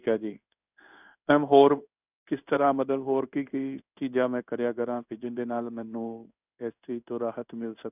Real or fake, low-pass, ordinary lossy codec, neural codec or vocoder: fake; 3.6 kHz; none; codec, 16 kHz in and 24 kHz out, 1 kbps, XY-Tokenizer